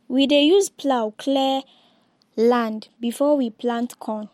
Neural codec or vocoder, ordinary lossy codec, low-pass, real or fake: none; MP3, 64 kbps; 19.8 kHz; real